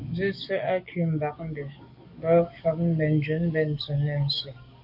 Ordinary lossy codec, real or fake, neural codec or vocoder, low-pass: AAC, 48 kbps; fake; codec, 44.1 kHz, 7.8 kbps, DAC; 5.4 kHz